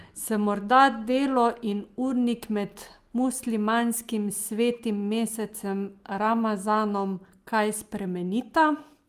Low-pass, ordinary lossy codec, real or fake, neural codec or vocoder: 14.4 kHz; Opus, 32 kbps; real; none